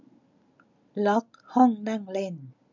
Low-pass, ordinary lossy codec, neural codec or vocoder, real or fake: 7.2 kHz; none; none; real